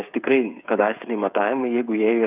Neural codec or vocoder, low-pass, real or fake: codec, 16 kHz, 8 kbps, FreqCodec, smaller model; 3.6 kHz; fake